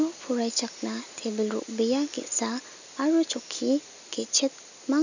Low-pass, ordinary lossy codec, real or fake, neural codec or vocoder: 7.2 kHz; none; real; none